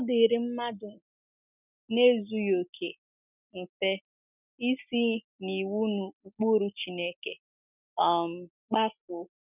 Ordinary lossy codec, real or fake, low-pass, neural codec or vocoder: none; real; 3.6 kHz; none